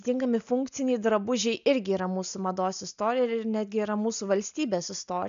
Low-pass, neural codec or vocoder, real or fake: 7.2 kHz; none; real